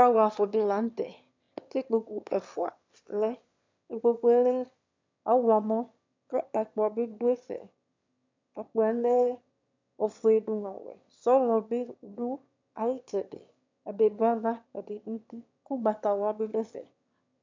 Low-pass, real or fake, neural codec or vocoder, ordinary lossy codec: 7.2 kHz; fake; autoencoder, 22.05 kHz, a latent of 192 numbers a frame, VITS, trained on one speaker; MP3, 64 kbps